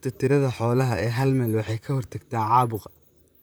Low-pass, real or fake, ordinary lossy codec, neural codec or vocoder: none; fake; none; vocoder, 44.1 kHz, 128 mel bands, Pupu-Vocoder